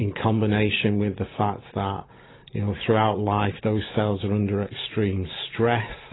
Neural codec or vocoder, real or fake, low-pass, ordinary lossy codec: none; real; 7.2 kHz; AAC, 16 kbps